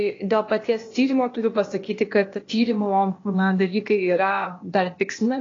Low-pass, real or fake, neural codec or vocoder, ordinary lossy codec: 7.2 kHz; fake; codec, 16 kHz, 0.8 kbps, ZipCodec; AAC, 32 kbps